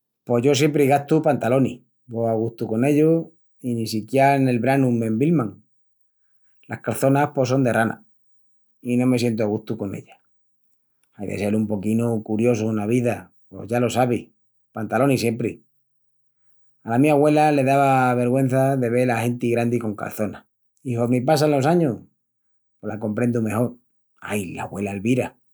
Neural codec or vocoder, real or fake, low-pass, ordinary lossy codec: none; real; none; none